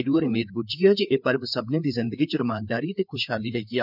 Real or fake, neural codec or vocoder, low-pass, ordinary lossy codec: fake; codec, 16 kHz, 4 kbps, FreqCodec, larger model; 5.4 kHz; none